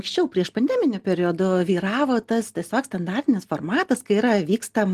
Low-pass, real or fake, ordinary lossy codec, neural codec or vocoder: 14.4 kHz; real; Opus, 24 kbps; none